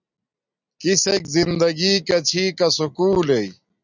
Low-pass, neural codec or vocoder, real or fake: 7.2 kHz; none; real